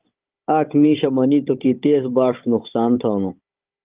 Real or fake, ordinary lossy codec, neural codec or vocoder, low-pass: fake; Opus, 32 kbps; codec, 16 kHz, 16 kbps, FunCodec, trained on Chinese and English, 50 frames a second; 3.6 kHz